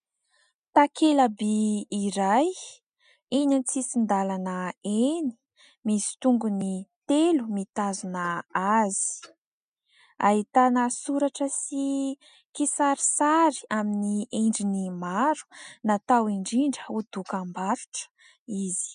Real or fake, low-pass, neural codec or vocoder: real; 9.9 kHz; none